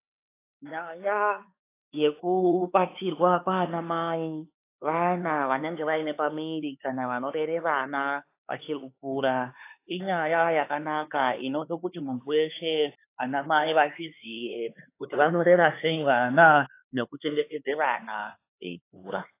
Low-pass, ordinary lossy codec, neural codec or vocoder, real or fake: 3.6 kHz; AAC, 24 kbps; codec, 16 kHz, 4 kbps, X-Codec, HuBERT features, trained on LibriSpeech; fake